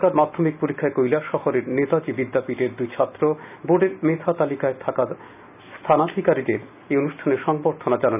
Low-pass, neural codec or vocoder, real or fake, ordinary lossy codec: 3.6 kHz; none; real; none